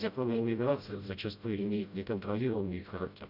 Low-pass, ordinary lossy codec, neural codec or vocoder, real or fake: 5.4 kHz; none; codec, 16 kHz, 0.5 kbps, FreqCodec, smaller model; fake